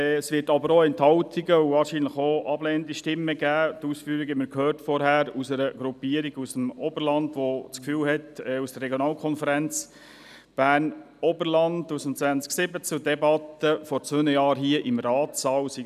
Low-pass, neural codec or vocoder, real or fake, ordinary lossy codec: 14.4 kHz; none; real; none